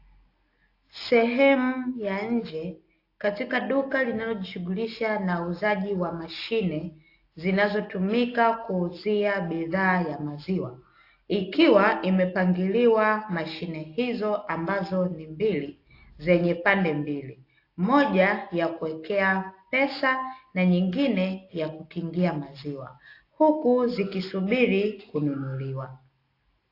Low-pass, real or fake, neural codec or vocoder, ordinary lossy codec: 5.4 kHz; real; none; AAC, 32 kbps